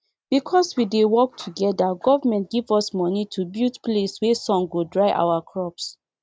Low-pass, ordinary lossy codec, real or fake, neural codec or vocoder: none; none; real; none